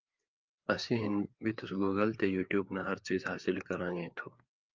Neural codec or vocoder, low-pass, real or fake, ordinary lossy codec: codec, 16 kHz, 4 kbps, FreqCodec, larger model; 7.2 kHz; fake; Opus, 24 kbps